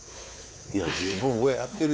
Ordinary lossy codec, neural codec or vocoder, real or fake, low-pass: none; codec, 16 kHz, 4 kbps, X-Codec, WavLM features, trained on Multilingual LibriSpeech; fake; none